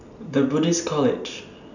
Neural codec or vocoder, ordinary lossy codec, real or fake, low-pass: none; none; real; 7.2 kHz